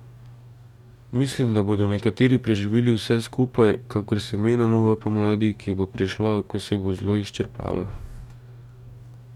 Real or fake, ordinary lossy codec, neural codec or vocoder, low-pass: fake; none; codec, 44.1 kHz, 2.6 kbps, DAC; 19.8 kHz